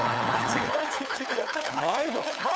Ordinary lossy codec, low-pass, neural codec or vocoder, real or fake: none; none; codec, 16 kHz, 4 kbps, FunCodec, trained on LibriTTS, 50 frames a second; fake